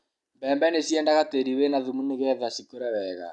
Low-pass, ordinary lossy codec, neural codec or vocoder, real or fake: 10.8 kHz; none; none; real